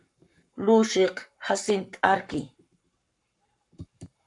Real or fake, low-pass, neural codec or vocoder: fake; 10.8 kHz; codec, 44.1 kHz, 7.8 kbps, Pupu-Codec